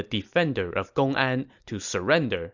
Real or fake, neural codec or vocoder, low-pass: real; none; 7.2 kHz